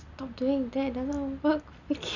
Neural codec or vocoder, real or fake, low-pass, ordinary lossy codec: none; real; 7.2 kHz; none